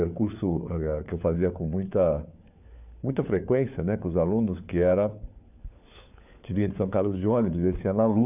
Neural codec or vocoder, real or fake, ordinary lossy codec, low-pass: codec, 16 kHz, 4 kbps, FunCodec, trained on LibriTTS, 50 frames a second; fake; none; 3.6 kHz